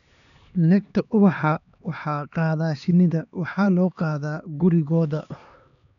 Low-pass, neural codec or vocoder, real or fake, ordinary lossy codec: 7.2 kHz; codec, 16 kHz, 2 kbps, X-Codec, HuBERT features, trained on LibriSpeech; fake; none